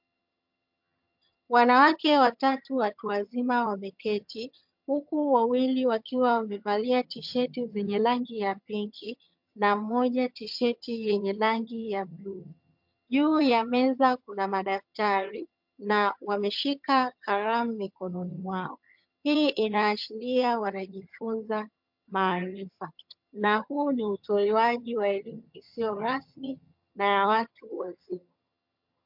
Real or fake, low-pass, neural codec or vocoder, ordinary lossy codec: fake; 5.4 kHz; vocoder, 22.05 kHz, 80 mel bands, HiFi-GAN; MP3, 48 kbps